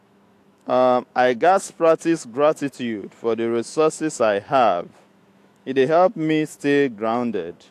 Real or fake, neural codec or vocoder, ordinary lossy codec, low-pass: fake; autoencoder, 48 kHz, 128 numbers a frame, DAC-VAE, trained on Japanese speech; AAC, 64 kbps; 14.4 kHz